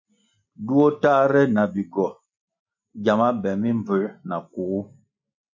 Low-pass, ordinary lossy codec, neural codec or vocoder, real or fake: 7.2 kHz; MP3, 48 kbps; none; real